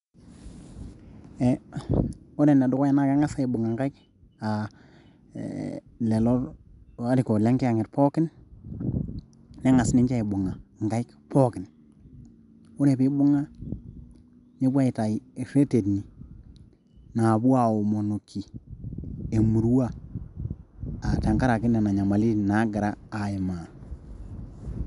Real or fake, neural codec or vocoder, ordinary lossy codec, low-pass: real; none; none; 10.8 kHz